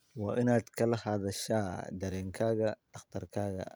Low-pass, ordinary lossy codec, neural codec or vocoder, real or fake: none; none; none; real